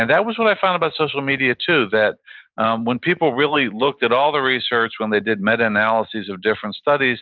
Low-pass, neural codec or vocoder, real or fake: 7.2 kHz; none; real